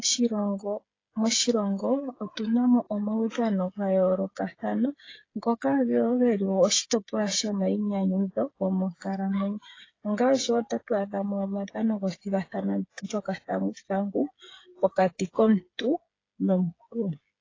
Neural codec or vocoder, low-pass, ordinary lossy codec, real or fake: codec, 16 kHz, 8 kbps, FreqCodec, smaller model; 7.2 kHz; AAC, 32 kbps; fake